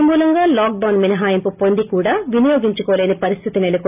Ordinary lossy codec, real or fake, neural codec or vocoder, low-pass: AAC, 32 kbps; real; none; 3.6 kHz